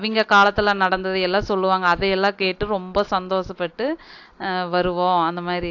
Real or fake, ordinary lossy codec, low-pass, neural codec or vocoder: real; AAC, 48 kbps; 7.2 kHz; none